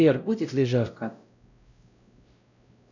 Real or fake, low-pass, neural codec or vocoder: fake; 7.2 kHz; codec, 16 kHz, 0.5 kbps, X-Codec, WavLM features, trained on Multilingual LibriSpeech